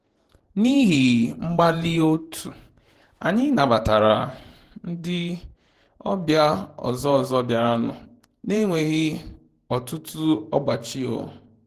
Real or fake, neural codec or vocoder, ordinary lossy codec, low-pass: fake; vocoder, 48 kHz, 128 mel bands, Vocos; Opus, 16 kbps; 14.4 kHz